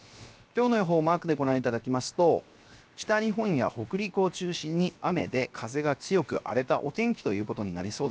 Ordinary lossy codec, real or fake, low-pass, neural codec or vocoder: none; fake; none; codec, 16 kHz, 0.7 kbps, FocalCodec